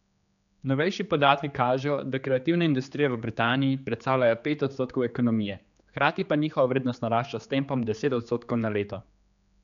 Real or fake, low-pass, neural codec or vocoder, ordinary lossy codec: fake; 7.2 kHz; codec, 16 kHz, 4 kbps, X-Codec, HuBERT features, trained on general audio; none